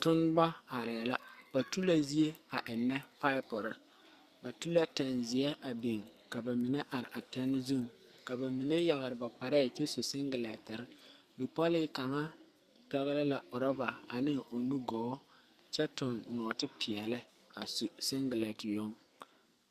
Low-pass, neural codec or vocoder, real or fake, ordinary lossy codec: 14.4 kHz; codec, 44.1 kHz, 2.6 kbps, SNAC; fake; Opus, 64 kbps